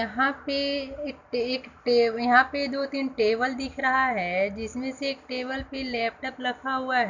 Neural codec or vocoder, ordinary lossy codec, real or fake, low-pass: none; none; real; 7.2 kHz